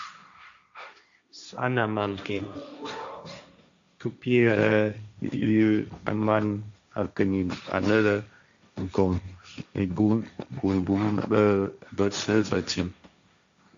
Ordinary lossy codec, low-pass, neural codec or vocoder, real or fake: AAC, 64 kbps; 7.2 kHz; codec, 16 kHz, 1.1 kbps, Voila-Tokenizer; fake